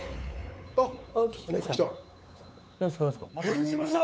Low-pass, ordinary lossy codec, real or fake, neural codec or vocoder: none; none; fake; codec, 16 kHz, 4 kbps, X-Codec, WavLM features, trained on Multilingual LibriSpeech